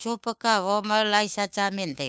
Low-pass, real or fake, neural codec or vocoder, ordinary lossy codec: none; fake; codec, 16 kHz, 2 kbps, FunCodec, trained on Chinese and English, 25 frames a second; none